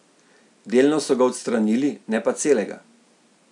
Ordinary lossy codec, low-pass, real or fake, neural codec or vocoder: none; 10.8 kHz; real; none